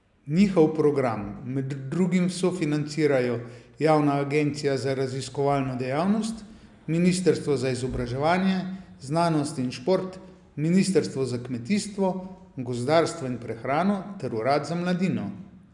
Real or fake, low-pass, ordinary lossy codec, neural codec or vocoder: real; 10.8 kHz; none; none